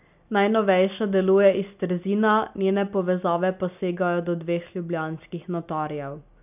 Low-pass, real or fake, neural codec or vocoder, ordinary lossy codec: 3.6 kHz; real; none; none